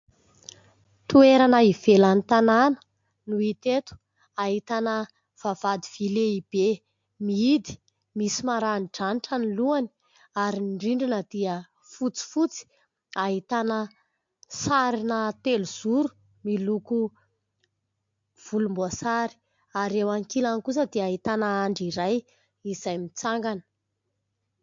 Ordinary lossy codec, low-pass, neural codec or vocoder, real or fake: MP3, 48 kbps; 7.2 kHz; none; real